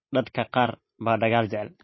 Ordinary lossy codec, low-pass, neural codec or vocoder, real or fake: MP3, 24 kbps; 7.2 kHz; none; real